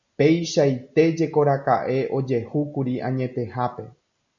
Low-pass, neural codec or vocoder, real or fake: 7.2 kHz; none; real